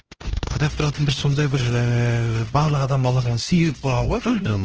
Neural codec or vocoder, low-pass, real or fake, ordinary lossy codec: codec, 16 kHz, 1 kbps, X-Codec, HuBERT features, trained on LibriSpeech; 7.2 kHz; fake; Opus, 16 kbps